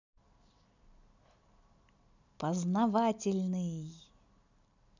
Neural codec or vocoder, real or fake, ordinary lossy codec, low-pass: none; real; none; 7.2 kHz